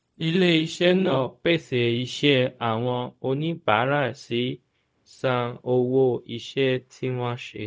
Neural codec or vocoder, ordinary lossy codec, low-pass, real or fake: codec, 16 kHz, 0.4 kbps, LongCat-Audio-Codec; none; none; fake